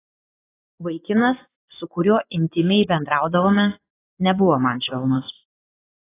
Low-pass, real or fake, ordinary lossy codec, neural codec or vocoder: 3.6 kHz; fake; AAC, 16 kbps; vocoder, 24 kHz, 100 mel bands, Vocos